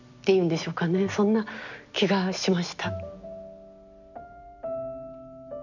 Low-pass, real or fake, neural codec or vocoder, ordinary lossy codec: 7.2 kHz; real; none; none